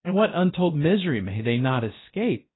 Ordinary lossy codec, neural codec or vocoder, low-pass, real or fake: AAC, 16 kbps; codec, 16 kHz, about 1 kbps, DyCAST, with the encoder's durations; 7.2 kHz; fake